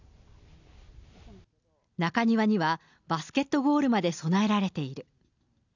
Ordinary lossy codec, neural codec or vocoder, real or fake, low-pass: none; none; real; 7.2 kHz